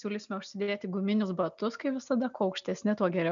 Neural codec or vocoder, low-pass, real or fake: none; 7.2 kHz; real